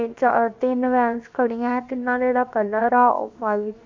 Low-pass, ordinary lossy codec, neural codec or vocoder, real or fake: 7.2 kHz; MP3, 64 kbps; codec, 16 kHz, 0.7 kbps, FocalCodec; fake